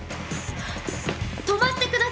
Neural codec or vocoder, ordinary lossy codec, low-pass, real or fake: none; none; none; real